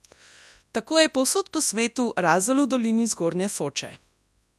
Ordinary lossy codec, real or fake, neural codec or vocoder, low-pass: none; fake; codec, 24 kHz, 0.9 kbps, WavTokenizer, large speech release; none